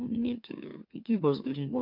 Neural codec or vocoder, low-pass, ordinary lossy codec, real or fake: autoencoder, 44.1 kHz, a latent of 192 numbers a frame, MeloTTS; 5.4 kHz; MP3, 48 kbps; fake